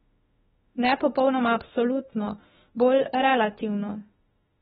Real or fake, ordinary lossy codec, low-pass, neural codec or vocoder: fake; AAC, 16 kbps; 19.8 kHz; autoencoder, 48 kHz, 32 numbers a frame, DAC-VAE, trained on Japanese speech